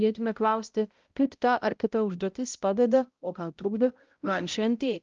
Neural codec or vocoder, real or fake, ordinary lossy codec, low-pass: codec, 16 kHz, 0.5 kbps, X-Codec, HuBERT features, trained on balanced general audio; fake; Opus, 24 kbps; 7.2 kHz